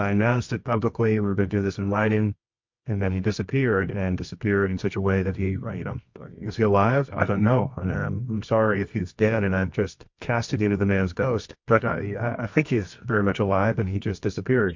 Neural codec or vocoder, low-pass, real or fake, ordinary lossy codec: codec, 24 kHz, 0.9 kbps, WavTokenizer, medium music audio release; 7.2 kHz; fake; MP3, 48 kbps